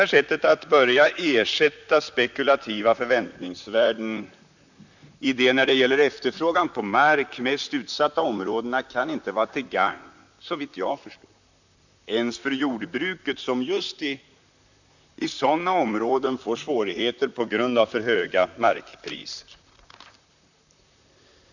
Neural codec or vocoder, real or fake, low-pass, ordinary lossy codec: vocoder, 44.1 kHz, 128 mel bands, Pupu-Vocoder; fake; 7.2 kHz; none